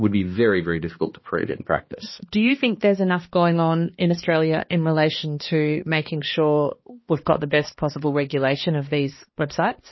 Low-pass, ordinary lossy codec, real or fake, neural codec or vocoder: 7.2 kHz; MP3, 24 kbps; fake; codec, 16 kHz, 2 kbps, X-Codec, HuBERT features, trained on balanced general audio